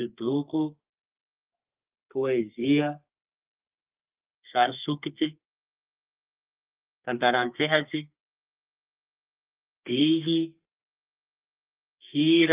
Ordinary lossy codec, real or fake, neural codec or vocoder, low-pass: Opus, 24 kbps; fake; codec, 32 kHz, 1.9 kbps, SNAC; 3.6 kHz